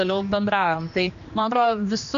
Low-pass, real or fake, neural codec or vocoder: 7.2 kHz; fake; codec, 16 kHz, 2 kbps, X-Codec, HuBERT features, trained on general audio